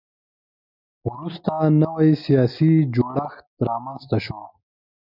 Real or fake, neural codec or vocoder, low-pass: real; none; 5.4 kHz